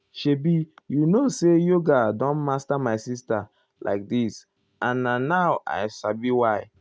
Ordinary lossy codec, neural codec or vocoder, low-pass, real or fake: none; none; none; real